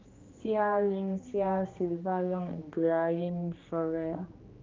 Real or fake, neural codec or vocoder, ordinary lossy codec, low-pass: fake; codec, 16 kHz, 2 kbps, X-Codec, HuBERT features, trained on general audio; Opus, 32 kbps; 7.2 kHz